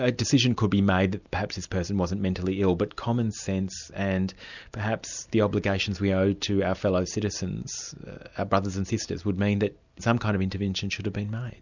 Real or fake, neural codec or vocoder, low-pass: real; none; 7.2 kHz